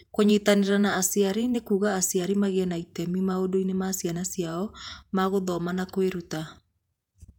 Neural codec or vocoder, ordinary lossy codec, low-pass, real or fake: vocoder, 48 kHz, 128 mel bands, Vocos; none; 19.8 kHz; fake